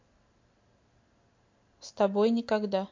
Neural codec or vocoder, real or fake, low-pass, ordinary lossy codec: none; real; 7.2 kHz; MP3, 48 kbps